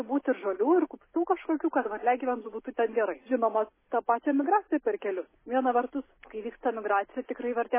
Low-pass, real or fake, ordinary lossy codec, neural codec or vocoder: 3.6 kHz; real; MP3, 16 kbps; none